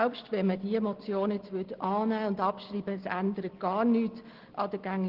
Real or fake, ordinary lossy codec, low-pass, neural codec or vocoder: real; Opus, 16 kbps; 5.4 kHz; none